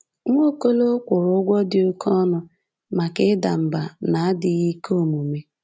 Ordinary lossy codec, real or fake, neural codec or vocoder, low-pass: none; real; none; none